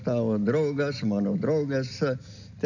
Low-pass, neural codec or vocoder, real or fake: 7.2 kHz; none; real